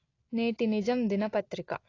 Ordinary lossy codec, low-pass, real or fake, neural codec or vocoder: AAC, 32 kbps; 7.2 kHz; real; none